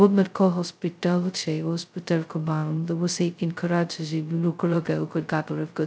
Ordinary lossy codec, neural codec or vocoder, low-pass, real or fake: none; codec, 16 kHz, 0.2 kbps, FocalCodec; none; fake